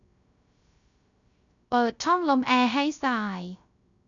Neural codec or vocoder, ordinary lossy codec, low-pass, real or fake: codec, 16 kHz, 0.3 kbps, FocalCodec; none; 7.2 kHz; fake